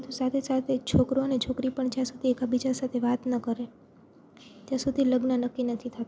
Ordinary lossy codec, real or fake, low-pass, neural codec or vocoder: none; real; none; none